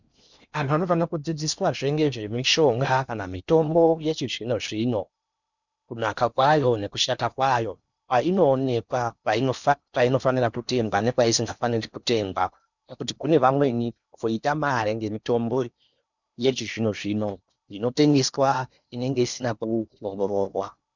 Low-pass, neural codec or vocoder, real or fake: 7.2 kHz; codec, 16 kHz in and 24 kHz out, 0.8 kbps, FocalCodec, streaming, 65536 codes; fake